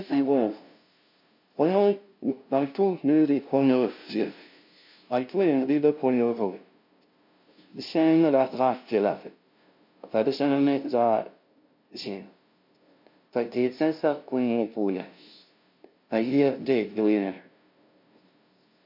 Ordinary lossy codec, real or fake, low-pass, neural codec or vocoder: MP3, 48 kbps; fake; 5.4 kHz; codec, 16 kHz, 0.5 kbps, FunCodec, trained on LibriTTS, 25 frames a second